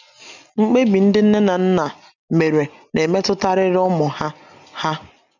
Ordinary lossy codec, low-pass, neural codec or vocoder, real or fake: none; 7.2 kHz; none; real